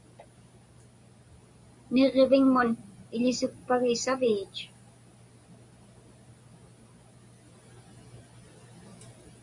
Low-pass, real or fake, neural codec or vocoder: 10.8 kHz; real; none